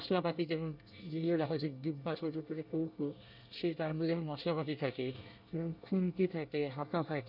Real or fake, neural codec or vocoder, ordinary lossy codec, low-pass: fake; codec, 24 kHz, 1 kbps, SNAC; none; 5.4 kHz